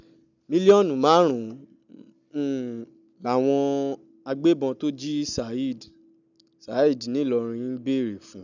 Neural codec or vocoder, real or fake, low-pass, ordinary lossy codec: none; real; 7.2 kHz; none